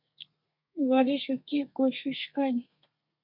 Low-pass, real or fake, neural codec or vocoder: 5.4 kHz; fake; codec, 32 kHz, 1.9 kbps, SNAC